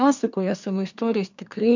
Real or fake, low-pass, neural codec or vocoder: fake; 7.2 kHz; codec, 24 kHz, 1 kbps, SNAC